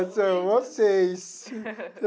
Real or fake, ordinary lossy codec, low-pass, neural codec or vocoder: real; none; none; none